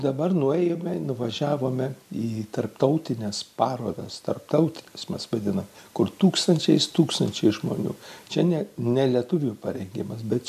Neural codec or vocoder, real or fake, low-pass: none; real; 14.4 kHz